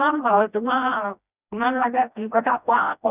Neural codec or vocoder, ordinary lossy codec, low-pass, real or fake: codec, 16 kHz, 1 kbps, FreqCodec, smaller model; none; 3.6 kHz; fake